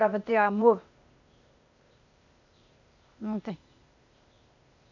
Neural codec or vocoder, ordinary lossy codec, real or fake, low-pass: codec, 16 kHz, 0.8 kbps, ZipCodec; none; fake; 7.2 kHz